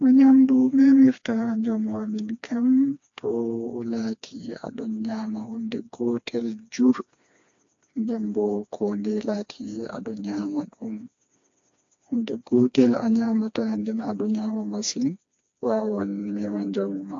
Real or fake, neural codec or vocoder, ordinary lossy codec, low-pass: fake; codec, 16 kHz, 2 kbps, FreqCodec, smaller model; AAC, 48 kbps; 7.2 kHz